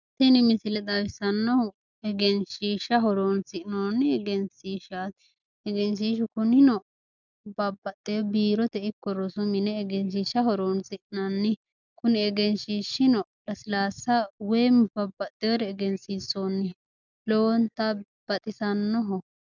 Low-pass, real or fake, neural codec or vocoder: 7.2 kHz; real; none